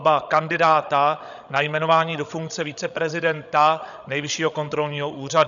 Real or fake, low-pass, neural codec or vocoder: fake; 7.2 kHz; codec, 16 kHz, 16 kbps, FreqCodec, larger model